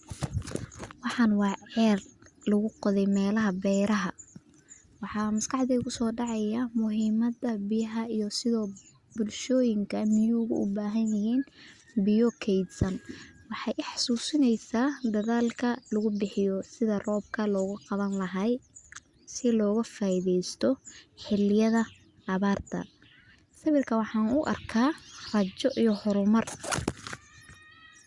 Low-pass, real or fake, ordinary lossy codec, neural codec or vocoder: 10.8 kHz; real; Opus, 64 kbps; none